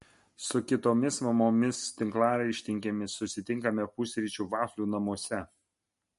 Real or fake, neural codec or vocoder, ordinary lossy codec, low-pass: real; none; MP3, 48 kbps; 14.4 kHz